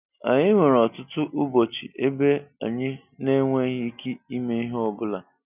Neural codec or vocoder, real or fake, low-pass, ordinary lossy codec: none; real; 3.6 kHz; none